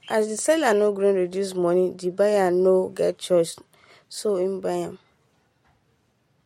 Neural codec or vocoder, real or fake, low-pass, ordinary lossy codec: none; real; 19.8 kHz; MP3, 64 kbps